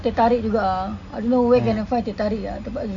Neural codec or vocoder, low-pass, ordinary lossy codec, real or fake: none; 7.2 kHz; AAC, 48 kbps; real